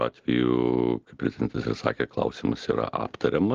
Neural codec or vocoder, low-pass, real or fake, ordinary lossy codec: none; 7.2 kHz; real; Opus, 16 kbps